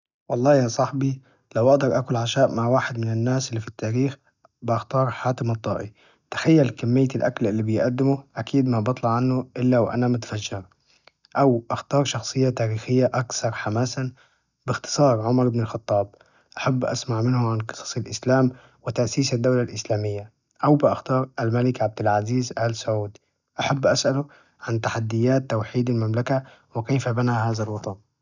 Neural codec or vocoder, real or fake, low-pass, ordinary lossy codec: none; real; 7.2 kHz; none